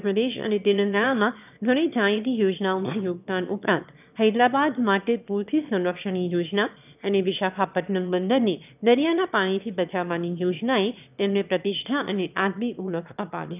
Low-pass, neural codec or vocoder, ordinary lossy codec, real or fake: 3.6 kHz; autoencoder, 22.05 kHz, a latent of 192 numbers a frame, VITS, trained on one speaker; none; fake